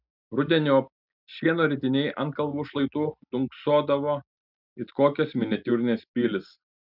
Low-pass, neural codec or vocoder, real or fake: 5.4 kHz; none; real